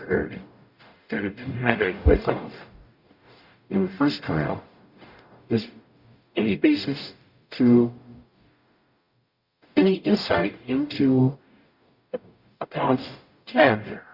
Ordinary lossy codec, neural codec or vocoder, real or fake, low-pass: Opus, 64 kbps; codec, 44.1 kHz, 0.9 kbps, DAC; fake; 5.4 kHz